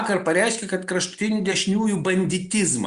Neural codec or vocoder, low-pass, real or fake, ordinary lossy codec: none; 10.8 kHz; real; Opus, 64 kbps